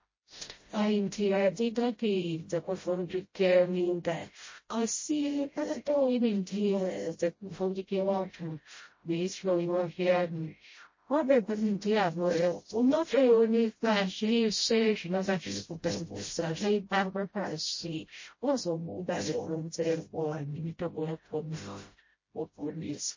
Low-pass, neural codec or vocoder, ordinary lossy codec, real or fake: 7.2 kHz; codec, 16 kHz, 0.5 kbps, FreqCodec, smaller model; MP3, 32 kbps; fake